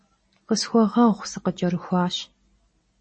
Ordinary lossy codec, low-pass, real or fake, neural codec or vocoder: MP3, 32 kbps; 9.9 kHz; real; none